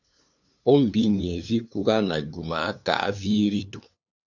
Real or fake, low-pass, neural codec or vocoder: fake; 7.2 kHz; codec, 16 kHz, 2 kbps, FunCodec, trained on LibriTTS, 25 frames a second